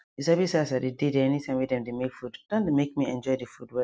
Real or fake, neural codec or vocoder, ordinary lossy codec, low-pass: real; none; none; none